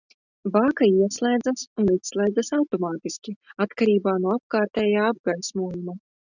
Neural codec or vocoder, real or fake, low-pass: none; real; 7.2 kHz